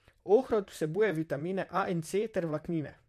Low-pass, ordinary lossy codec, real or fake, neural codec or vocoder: 14.4 kHz; MP3, 64 kbps; fake; vocoder, 44.1 kHz, 128 mel bands, Pupu-Vocoder